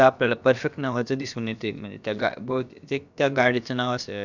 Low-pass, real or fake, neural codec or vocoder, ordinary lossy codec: 7.2 kHz; fake; codec, 16 kHz, about 1 kbps, DyCAST, with the encoder's durations; none